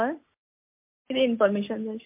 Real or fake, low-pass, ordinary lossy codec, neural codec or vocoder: real; 3.6 kHz; MP3, 32 kbps; none